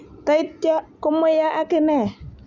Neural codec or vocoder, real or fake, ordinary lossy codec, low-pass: none; real; none; 7.2 kHz